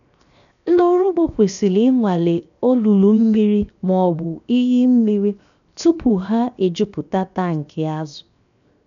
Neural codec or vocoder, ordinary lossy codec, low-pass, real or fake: codec, 16 kHz, 0.7 kbps, FocalCodec; none; 7.2 kHz; fake